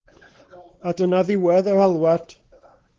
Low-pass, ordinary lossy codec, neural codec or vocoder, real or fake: 7.2 kHz; Opus, 16 kbps; codec, 16 kHz, 4 kbps, X-Codec, WavLM features, trained on Multilingual LibriSpeech; fake